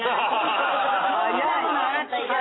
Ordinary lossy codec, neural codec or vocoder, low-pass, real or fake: AAC, 16 kbps; none; 7.2 kHz; real